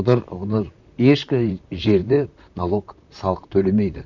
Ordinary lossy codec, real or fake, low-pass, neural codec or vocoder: none; fake; 7.2 kHz; vocoder, 44.1 kHz, 128 mel bands, Pupu-Vocoder